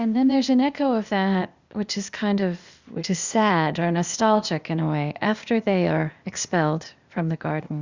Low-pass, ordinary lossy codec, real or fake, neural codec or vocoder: 7.2 kHz; Opus, 64 kbps; fake; codec, 16 kHz, 0.8 kbps, ZipCodec